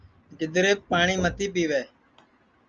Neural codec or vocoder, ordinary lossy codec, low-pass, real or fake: none; Opus, 32 kbps; 7.2 kHz; real